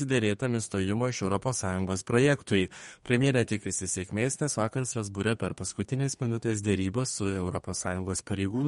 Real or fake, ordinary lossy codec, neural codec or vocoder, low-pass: fake; MP3, 48 kbps; codec, 32 kHz, 1.9 kbps, SNAC; 14.4 kHz